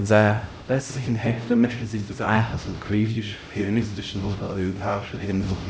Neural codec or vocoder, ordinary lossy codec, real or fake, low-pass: codec, 16 kHz, 0.5 kbps, X-Codec, HuBERT features, trained on LibriSpeech; none; fake; none